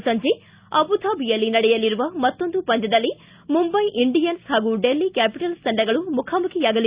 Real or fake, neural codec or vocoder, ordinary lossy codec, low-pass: real; none; Opus, 64 kbps; 3.6 kHz